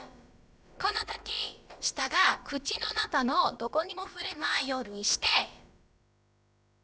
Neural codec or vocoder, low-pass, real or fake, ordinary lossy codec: codec, 16 kHz, about 1 kbps, DyCAST, with the encoder's durations; none; fake; none